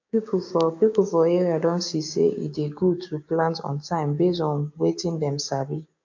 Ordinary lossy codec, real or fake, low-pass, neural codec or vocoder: AAC, 48 kbps; fake; 7.2 kHz; codec, 16 kHz, 6 kbps, DAC